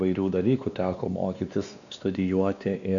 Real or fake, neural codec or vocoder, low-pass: fake; codec, 16 kHz, 2 kbps, X-Codec, WavLM features, trained on Multilingual LibriSpeech; 7.2 kHz